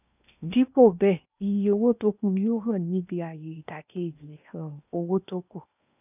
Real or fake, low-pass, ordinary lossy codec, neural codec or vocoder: fake; 3.6 kHz; none; codec, 16 kHz in and 24 kHz out, 0.8 kbps, FocalCodec, streaming, 65536 codes